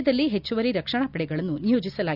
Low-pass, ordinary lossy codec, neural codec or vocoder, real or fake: 5.4 kHz; none; none; real